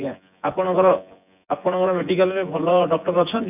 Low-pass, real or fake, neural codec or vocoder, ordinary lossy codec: 3.6 kHz; fake; vocoder, 24 kHz, 100 mel bands, Vocos; none